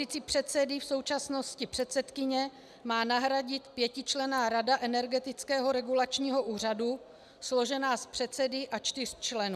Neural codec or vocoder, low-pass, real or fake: none; 14.4 kHz; real